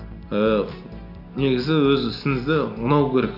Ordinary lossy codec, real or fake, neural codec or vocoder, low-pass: none; real; none; 5.4 kHz